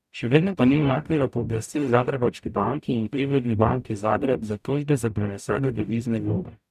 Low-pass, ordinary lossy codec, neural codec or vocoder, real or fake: 14.4 kHz; none; codec, 44.1 kHz, 0.9 kbps, DAC; fake